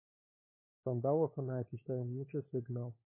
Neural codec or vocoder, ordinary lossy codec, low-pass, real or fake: codec, 16 kHz, 4 kbps, FunCodec, trained on LibriTTS, 50 frames a second; MP3, 16 kbps; 3.6 kHz; fake